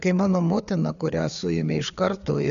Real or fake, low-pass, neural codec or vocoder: fake; 7.2 kHz; codec, 16 kHz, 4 kbps, FreqCodec, larger model